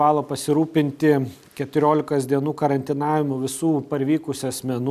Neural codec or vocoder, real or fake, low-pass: none; real; 14.4 kHz